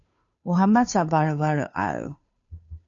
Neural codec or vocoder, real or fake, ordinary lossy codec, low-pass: codec, 16 kHz, 2 kbps, FunCodec, trained on Chinese and English, 25 frames a second; fake; AAC, 48 kbps; 7.2 kHz